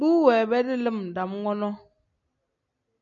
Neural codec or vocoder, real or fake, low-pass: none; real; 7.2 kHz